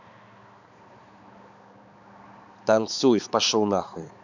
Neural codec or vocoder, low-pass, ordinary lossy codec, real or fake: codec, 16 kHz, 4 kbps, X-Codec, HuBERT features, trained on balanced general audio; 7.2 kHz; none; fake